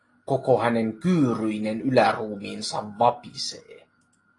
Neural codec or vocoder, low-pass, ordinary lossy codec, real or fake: none; 10.8 kHz; AAC, 32 kbps; real